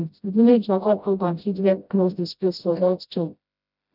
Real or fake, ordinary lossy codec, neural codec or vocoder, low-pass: fake; none; codec, 16 kHz, 0.5 kbps, FreqCodec, smaller model; 5.4 kHz